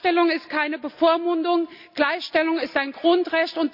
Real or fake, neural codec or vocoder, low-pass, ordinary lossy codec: real; none; 5.4 kHz; none